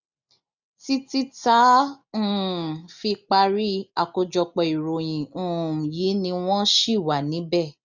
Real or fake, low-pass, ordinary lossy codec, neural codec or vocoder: real; 7.2 kHz; none; none